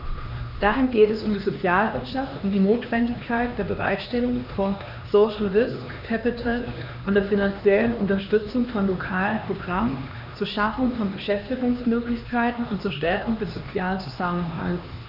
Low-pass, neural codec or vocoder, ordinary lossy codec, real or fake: 5.4 kHz; codec, 16 kHz, 2 kbps, X-Codec, HuBERT features, trained on LibriSpeech; none; fake